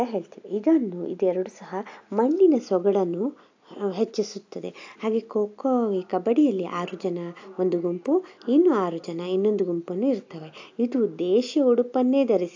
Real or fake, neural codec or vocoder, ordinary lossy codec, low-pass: real; none; AAC, 48 kbps; 7.2 kHz